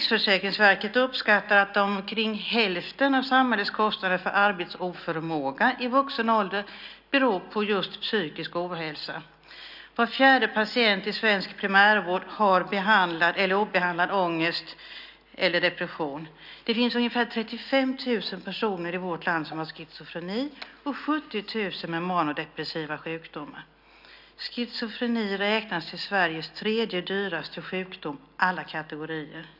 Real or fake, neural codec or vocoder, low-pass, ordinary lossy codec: real; none; 5.4 kHz; none